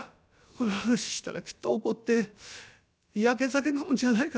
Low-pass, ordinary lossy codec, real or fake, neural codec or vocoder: none; none; fake; codec, 16 kHz, about 1 kbps, DyCAST, with the encoder's durations